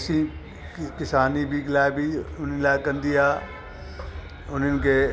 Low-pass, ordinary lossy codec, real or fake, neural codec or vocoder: none; none; real; none